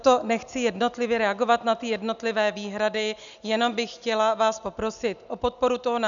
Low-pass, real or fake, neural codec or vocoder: 7.2 kHz; real; none